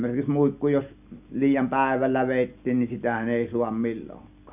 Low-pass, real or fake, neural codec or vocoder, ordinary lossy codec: 3.6 kHz; real; none; none